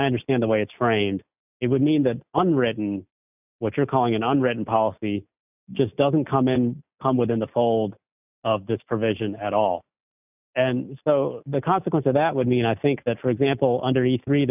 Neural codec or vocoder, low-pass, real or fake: none; 3.6 kHz; real